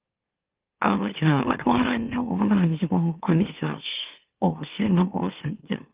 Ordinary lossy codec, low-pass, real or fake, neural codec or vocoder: Opus, 32 kbps; 3.6 kHz; fake; autoencoder, 44.1 kHz, a latent of 192 numbers a frame, MeloTTS